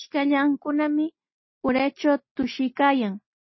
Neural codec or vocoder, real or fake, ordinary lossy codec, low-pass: none; real; MP3, 24 kbps; 7.2 kHz